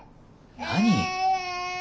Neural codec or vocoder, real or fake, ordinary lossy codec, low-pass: none; real; none; none